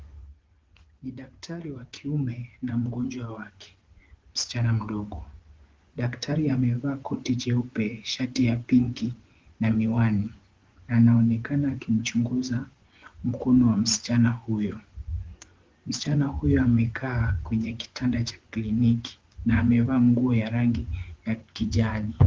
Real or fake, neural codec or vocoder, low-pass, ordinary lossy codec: fake; vocoder, 24 kHz, 100 mel bands, Vocos; 7.2 kHz; Opus, 16 kbps